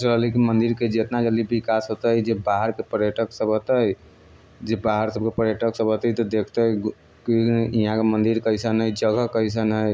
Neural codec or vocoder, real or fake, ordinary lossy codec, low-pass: none; real; none; none